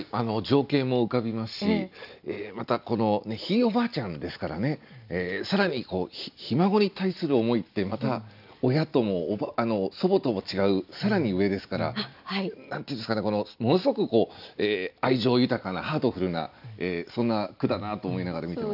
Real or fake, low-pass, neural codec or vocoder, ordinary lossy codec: real; 5.4 kHz; none; none